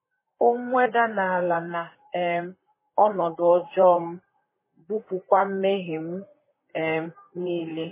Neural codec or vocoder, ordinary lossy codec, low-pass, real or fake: vocoder, 44.1 kHz, 128 mel bands, Pupu-Vocoder; MP3, 16 kbps; 3.6 kHz; fake